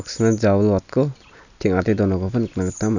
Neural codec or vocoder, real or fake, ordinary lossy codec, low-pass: none; real; none; 7.2 kHz